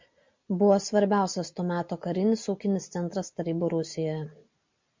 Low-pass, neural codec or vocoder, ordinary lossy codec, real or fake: 7.2 kHz; none; MP3, 64 kbps; real